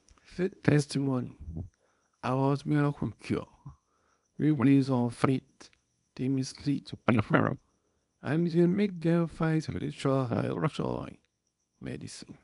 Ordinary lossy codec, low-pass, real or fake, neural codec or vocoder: none; 10.8 kHz; fake; codec, 24 kHz, 0.9 kbps, WavTokenizer, small release